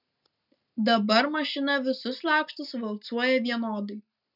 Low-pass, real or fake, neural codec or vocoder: 5.4 kHz; real; none